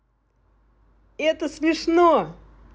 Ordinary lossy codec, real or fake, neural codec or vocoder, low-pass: none; real; none; none